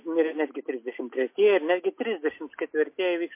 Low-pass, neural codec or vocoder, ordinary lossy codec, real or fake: 3.6 kHz; none; MP3, 24 kbps; real